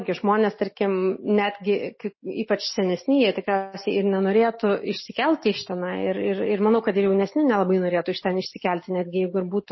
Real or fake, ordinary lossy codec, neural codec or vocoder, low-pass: real; MP3, 24 kbps; none; 7.2 kHz